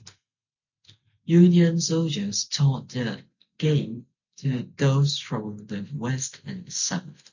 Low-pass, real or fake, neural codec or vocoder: 7.2 kHz; real; none